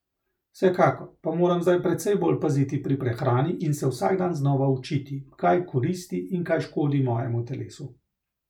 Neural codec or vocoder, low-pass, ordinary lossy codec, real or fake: none; 19.8 kHz; none; real